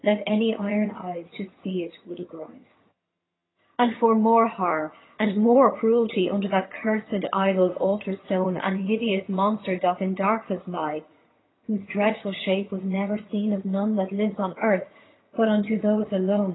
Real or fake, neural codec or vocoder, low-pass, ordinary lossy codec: fake; vocoder, 22.05 kHz, 80 mel bands, HiFi-GAN; 7.2 kHz; AAC, 16 kbps